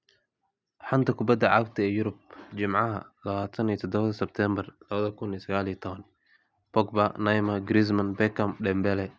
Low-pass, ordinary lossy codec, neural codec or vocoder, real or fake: none; none; none; real